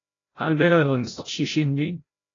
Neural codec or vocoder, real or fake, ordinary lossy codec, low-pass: codec, 16 kHz, 0.5 kbps, FreqCodec, larger model; fake; AAC, 32 kbps; 7.2 kHz